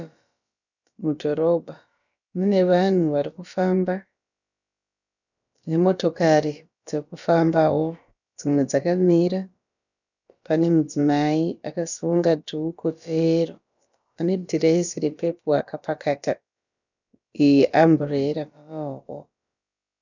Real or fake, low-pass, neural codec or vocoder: fake; 7.2 kHz; codec, 16 kHz, about 1 kbps, DyCAST, with the encoder's durations